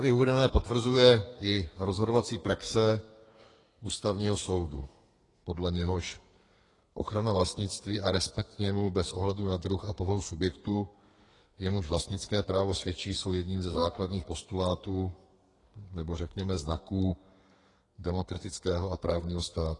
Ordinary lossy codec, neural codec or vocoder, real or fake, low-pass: AAC, 32 kbps; codec, 32 kHz, 1.9 kbps, SNAC; fake; 10.8 kHz